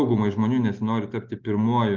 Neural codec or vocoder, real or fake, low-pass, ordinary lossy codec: none; real; 7.2 kHz; Opus, 24 kbps